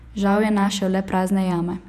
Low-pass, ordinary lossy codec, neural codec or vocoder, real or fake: 14.4 kHz; none; vocoder, 48 kHz, 128 mel bands, Vocos; fake